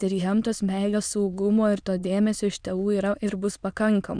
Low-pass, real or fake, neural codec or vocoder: 9.9 kHz; fake; autoencoder, 22.05 kHz, a latent of 192 numbers a frame, VITS, trained on many speakers